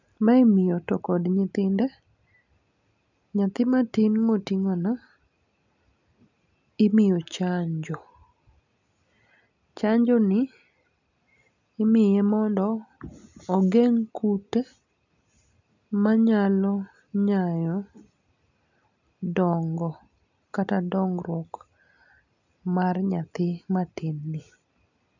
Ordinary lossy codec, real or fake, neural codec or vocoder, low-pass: none; real; none; 7.2 kHz